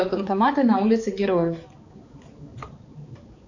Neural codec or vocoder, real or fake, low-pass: codec, 16 kHz, 4 kbps, X-Codec, HuBERT features, trained on balanced general audio; fake; 7.2 kHz